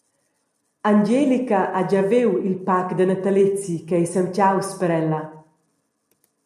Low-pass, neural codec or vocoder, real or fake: 14.4 kHz; none; real